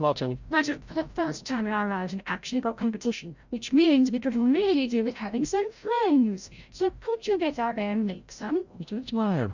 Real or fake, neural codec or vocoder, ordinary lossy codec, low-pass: fake; codec, 16 kHz, 0.5 kbps, FreqCodec, larger model; Opus, 64 kbps; 7.2 kHz